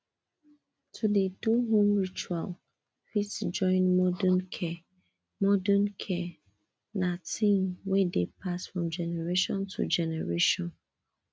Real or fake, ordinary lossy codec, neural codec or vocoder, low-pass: real; none; none; none